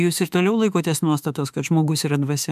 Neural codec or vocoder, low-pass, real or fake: autoencoder, 48 kHz, 32 numbers a frame, DAC-VAE, trained on Japanese speech; 14.4 kHz; fake